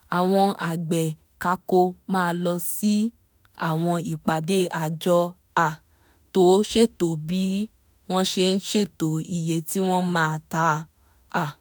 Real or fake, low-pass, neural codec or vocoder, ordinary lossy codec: fake; none; autoencoder, 48 kHz, 32 numbers a frame, DAC-VAE, trained on Japanese speech; none